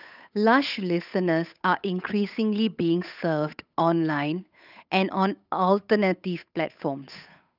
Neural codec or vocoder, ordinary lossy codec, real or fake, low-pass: codec, 16 kHz, 8 kbps, FunCodec, trained on Chinese and English, 25 frames a second; none; fake; 5.4 kHz